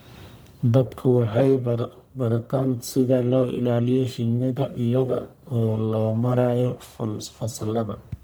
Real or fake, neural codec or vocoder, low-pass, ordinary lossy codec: fake; codec, 44.1 kHz, 1.7 kbps, Pupu-Codec; none; none